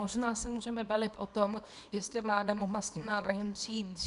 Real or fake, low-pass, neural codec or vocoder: fake; 10.8 kHz; codec, 24 kHz, 0.9 kbps, WavTokenizer, small release